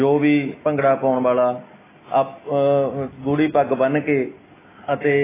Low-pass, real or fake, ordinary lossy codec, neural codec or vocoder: 3.6 kHz; real; AAC, 16 kbps; none